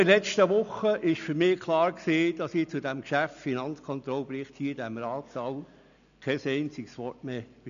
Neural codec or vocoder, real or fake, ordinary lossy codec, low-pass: none; real; none; 7.2 kHz